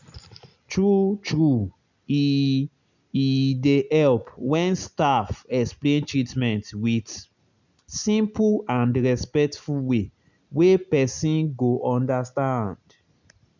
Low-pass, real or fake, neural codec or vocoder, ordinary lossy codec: 7.2 kHz; real; none; none